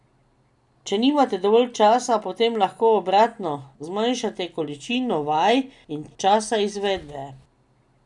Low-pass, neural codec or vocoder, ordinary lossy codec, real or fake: 10.8 kHz; none; none; real